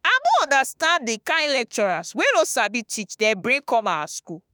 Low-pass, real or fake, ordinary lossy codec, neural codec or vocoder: none; fake; none; autoencoder, 48 kHz, 32 numbers a frame, DAC-VAE, trained on Japanese speech